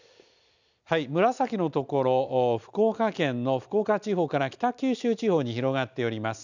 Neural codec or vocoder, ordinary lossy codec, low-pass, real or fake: none; none; 7.2 kHz; real